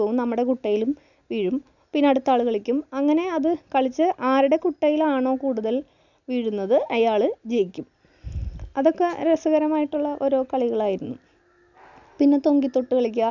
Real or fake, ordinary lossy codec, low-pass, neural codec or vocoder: real; none; 7.2 kHz; none